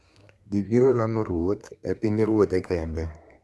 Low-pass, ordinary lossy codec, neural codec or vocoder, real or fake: none; none; codec, 24 kHz, 1 kbps, SNAC; fake